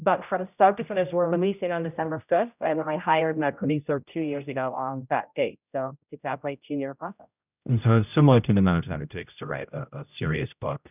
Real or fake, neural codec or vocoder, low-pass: fake; codec, 16 kHz, 0.5 kbps, X-Codec, HuBERT features, trained on general audio; 3.6 kHz